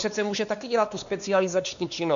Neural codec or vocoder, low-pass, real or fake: codec, 16 kHz, 2 kbps, X-Codec, WavLM features, trained on Multilingual LibriSpeech; 7.2 kHz; fake